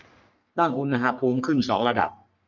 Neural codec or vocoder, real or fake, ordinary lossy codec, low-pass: codec, 44.1 kHz, 3.4 kbps, Pupu-Codec; fake; none; 7.2 kHz